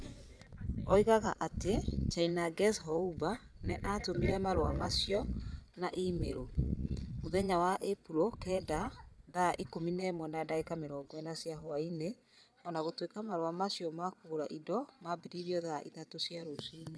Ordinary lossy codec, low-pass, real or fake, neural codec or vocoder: none; none; fake; vocoder, 22.05 kHz, 80 mel bands, Vocos